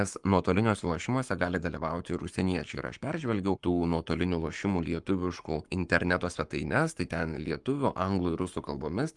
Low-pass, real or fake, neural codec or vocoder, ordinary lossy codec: 10.8 kHz; fake; codec, 44.1 kHz, 7.8 kbps, Pupu-Codec; Opus, 32 kbps